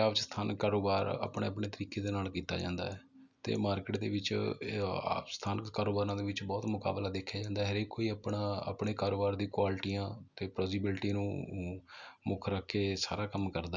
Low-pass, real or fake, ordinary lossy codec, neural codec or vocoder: 7.2 kHz; real; none; none